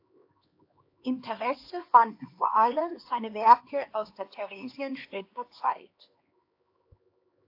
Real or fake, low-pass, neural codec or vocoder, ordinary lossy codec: fake; 5.4 kHz; codec, 16 kHz, 2 kbps, X-Codec, HuBERT features, trained on LibriSpeech; AAC, 32 kbps